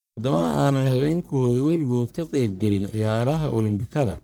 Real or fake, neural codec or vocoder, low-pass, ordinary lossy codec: fake; codec, 44.1 kHz, 1.7 kbps, Pupu-Codec; none; none